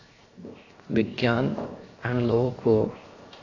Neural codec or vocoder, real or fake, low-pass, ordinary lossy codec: codec, 16 kHz, 0.7 kbps, FocalCodec; fake; 7.2 kHz; AAC, 32 kbps